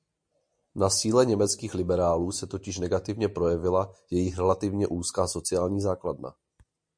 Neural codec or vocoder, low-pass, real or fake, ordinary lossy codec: none; 9.9 kHz; real; MP3, 48 kbps